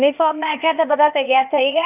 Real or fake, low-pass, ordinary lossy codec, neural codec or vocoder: fake; 3.6 kHz; none; codec, 16 kHz, 0.8 kbps, ZipCodec